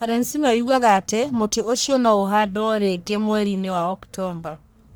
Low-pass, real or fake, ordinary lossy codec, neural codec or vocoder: none; fake; none; codec, 44.1 kHz, 1.7 kbps, Pupu-Codec